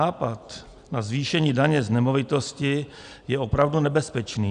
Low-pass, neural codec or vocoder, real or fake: 9.9 kHz; none; real